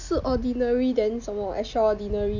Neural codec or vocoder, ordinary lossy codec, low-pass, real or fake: none; none; 7.2 kHz; real